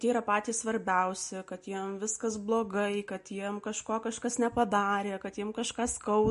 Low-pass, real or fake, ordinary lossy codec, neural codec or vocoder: 10.8 kHz; real; MP3, 48 kbps; none